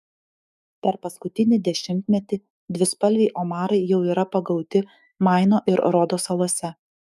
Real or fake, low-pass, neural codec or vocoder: fake; 14.4 kHz; autoencoder, 48 kHz, 128 numbers a frame, DAC-VAE, trained on Japanese speech